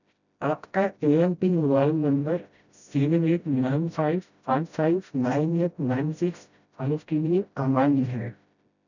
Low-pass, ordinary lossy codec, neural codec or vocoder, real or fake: 7.2 kHz; none; codec, 16 kHz, 0.5 kbps, FreqCodec, smaller model; fake